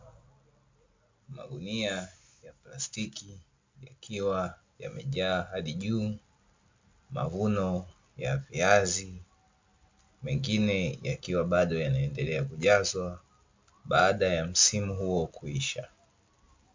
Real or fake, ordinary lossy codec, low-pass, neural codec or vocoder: real; MP3, 64 kbps; 7.2 kHz; none